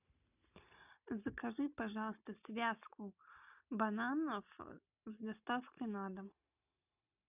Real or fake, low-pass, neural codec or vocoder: real; 3.6 kHz; none